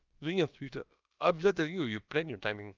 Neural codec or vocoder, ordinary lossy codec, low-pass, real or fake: codec, 16 kHz, about 1 kbps, DyCAST, with the encoder's durations; Opus, 24 kbps; 7.2 kHz; fake